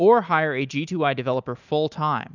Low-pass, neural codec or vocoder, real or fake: 7.2 kHz; none; real